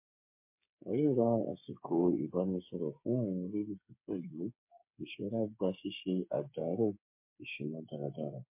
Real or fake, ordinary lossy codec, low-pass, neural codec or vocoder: fake; MP3, 24 kbps; 3.6 kHz; codec, 16 kHz, 8 kbps, FreqCodec, smaller model